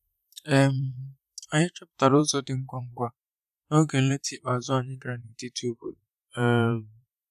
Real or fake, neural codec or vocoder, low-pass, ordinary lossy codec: fake; vocoder, 44.1 kHz, 128 mel bands, Pupu-Vocoder; 14.4 kHz; none